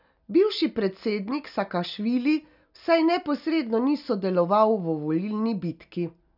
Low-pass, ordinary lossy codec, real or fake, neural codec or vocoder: 5.4 kHz; none; real; none